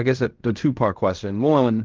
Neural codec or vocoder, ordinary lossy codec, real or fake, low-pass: codec, 16 kHz in and 24 kHz out, 0.4 kbps, LongCat-Audio-Codec, fine tuned four codebook decoder; Opus, 32 kbps; fake; 7.2 kHz